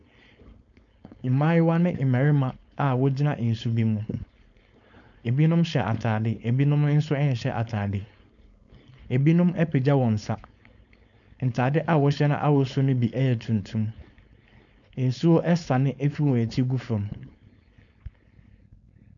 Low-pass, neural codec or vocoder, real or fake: 7.2 kHz; codec, 16 kHz, 4.8 kbps, FACodec; fake